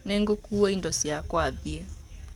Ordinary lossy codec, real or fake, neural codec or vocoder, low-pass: MP3, 96 kbps; fake; codec, 44.1 kHz, 7.8 kbps, DAC; 19.8 kHz